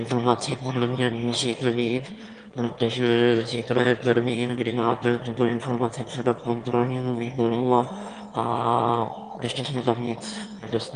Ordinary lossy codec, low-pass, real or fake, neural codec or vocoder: Opus, 32 kbps; 9.9 kHz; fake; autoencoder, 22.05 kHz, a latent of 192 numbers a frame, VITS, trained on one speaker